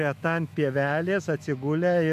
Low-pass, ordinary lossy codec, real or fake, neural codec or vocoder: 14.4 kHz; AAC, 96 kbps; fake; autoencoder, 48 kHz, 128 numbers a frame, DAC-VAE, trained on Japanese speech